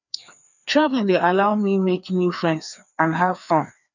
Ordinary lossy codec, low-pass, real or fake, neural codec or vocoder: none; 7.2 kHz; fake; codec, 16 kHz, 2 kbps, FreqCodec, larger model